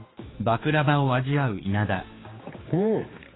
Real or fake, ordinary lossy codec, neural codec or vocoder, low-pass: fake; AAC, 16 kbps; codec, 16 kHz, 4 kbps, X-Codec, HuBERT features, trained on general audio; 7.2 kHz